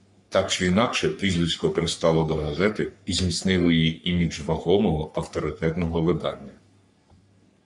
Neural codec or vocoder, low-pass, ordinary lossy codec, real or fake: codec, 44.1 kHz, 3.4 kbps, Pupu-Codec; 10.8 kHz; MP3, 96 kbps; fake